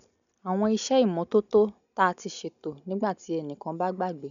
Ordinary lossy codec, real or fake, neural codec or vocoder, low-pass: none; real; none; 7.2 kHz